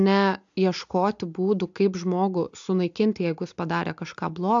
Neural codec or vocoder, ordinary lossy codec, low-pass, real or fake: none; AAC, 64 kbps; 7.2 kHz; real